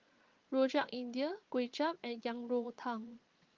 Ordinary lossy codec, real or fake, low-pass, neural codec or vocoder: Opus, 32 kbps; fake; 7.2 kHz; vocoder, 22.05 kHz, 80 mel bands, Vocos